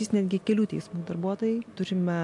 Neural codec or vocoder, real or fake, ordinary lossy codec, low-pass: none; real; MP3, 64 kbps; 10.8 kHz